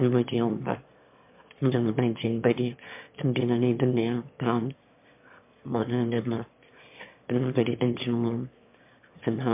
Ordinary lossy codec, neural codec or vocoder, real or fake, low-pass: MP3, 32 kbps; autoencoder, 22.05 kHz, a latent of 192 numbers a frame, VITS, trained on one speaker; fake; 3.6 kHz